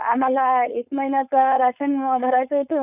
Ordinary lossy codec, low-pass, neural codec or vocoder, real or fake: none; 3.6 kHz; codec, 16 kHz, 4.8 kbps, FACodec; fake